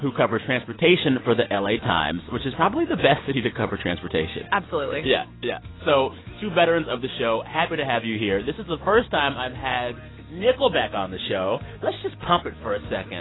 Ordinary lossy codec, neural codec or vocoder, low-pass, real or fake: AAC, 16 kbps; none; 7.2 kHz; real